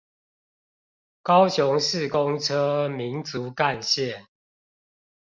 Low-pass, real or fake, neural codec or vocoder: 7.2 kHz; real; none